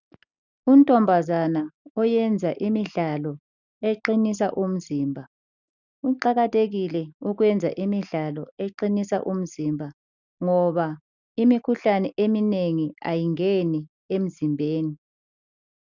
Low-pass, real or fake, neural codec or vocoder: 7.2 kHz; real; none